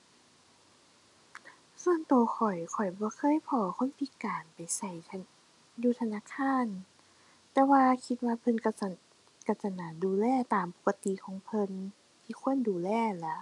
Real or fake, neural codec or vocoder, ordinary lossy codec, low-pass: real; none; none; 10.8 kHz